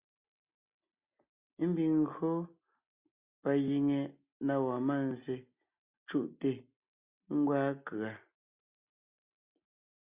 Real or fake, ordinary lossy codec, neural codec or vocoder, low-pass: real; Opus, 64 kbps; none; 3.6 kHz